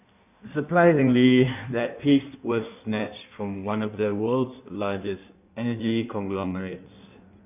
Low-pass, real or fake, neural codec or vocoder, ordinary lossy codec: 3.6 kHz; fake; codec, 16 kHz in and 24 kHz out, 1.1 kbps, FireRedTTS-2 codec; none